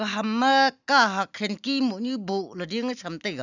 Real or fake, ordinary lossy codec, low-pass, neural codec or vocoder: real; none; 7.2 kHz; none